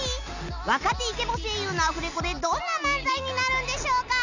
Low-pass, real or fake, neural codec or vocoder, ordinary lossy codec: 7.2 kHz; real; none; none